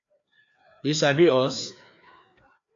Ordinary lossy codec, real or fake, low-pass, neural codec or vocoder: AAC, 48 kbps; fake; 7.2 kHz; codec, 16 kHz, 2 kbps, FreqCodec, larger model